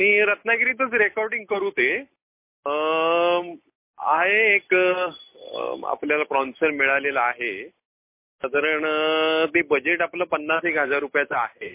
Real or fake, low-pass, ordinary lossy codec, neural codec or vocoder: real; 3.6 kHz; MP3, 24 kbps; none